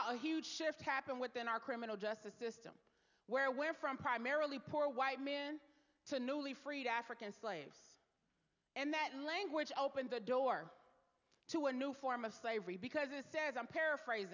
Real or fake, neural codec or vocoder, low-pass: real; none; 7.2 kHz